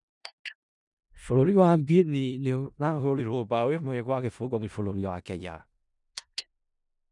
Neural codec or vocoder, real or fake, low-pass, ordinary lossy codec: codec, 16 kHz in and 24 kHz out, 0.4 kbps, LongCat-Audio-Codec, four codebook decoder; fake; 10.8 kHz; none